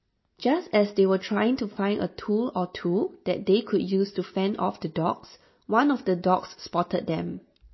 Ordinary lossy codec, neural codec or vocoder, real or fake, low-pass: MP3, 24 kbps; none; real; 7.2 kHz